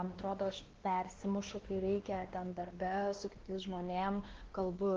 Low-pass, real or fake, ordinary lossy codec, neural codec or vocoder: 7.2 kHz; fake; Opus, 16 kbps; codec, 16 kHz, 2 kbps, X-Codec, WavLM features, trained on Multilingual LibriSpeech